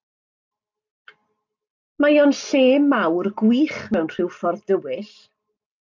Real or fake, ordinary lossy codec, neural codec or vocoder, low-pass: real; MP3, 64 kbps; none; 7.2 kHz